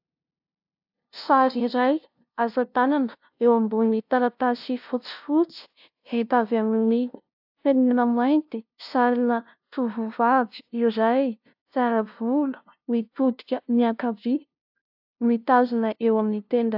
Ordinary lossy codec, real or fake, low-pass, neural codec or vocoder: AAC, 48 kbps; fake; 5.4 kHz; codec, 16 kHz, 0.5 kbps, FunCodec, trained on LibriTTS, 25 frames a second